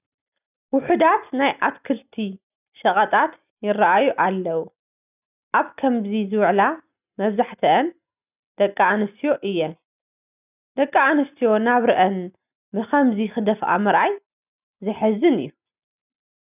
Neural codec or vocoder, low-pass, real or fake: none; 3.6 kHz; real